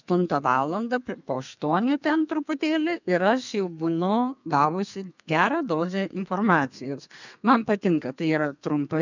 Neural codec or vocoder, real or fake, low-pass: codec, 32 kHz, 1.9 kbps, SNAC; fake; 7.2 kHz